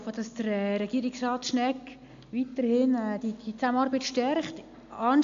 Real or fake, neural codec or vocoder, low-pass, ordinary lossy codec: real; none; 7.2 kHz; AAC, 64 kbps